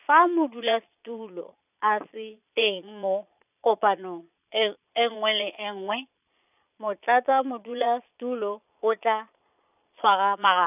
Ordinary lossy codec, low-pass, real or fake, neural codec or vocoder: none; 3.6 kHz; fake; vocoder, 22.05 kHz, 80 mel bands, Vocos